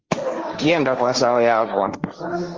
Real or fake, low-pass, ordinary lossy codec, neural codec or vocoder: fake; 7.2 kHz; Opus, 24 kbps; codec, 16 kHz, 1.1 kbps, Voila-Tokenizer